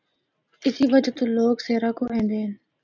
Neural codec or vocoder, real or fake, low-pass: none; real; 7.2 kHz